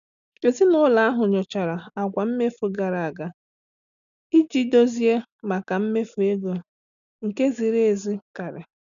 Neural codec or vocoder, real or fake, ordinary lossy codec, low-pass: none; real; none; 7.2 kHz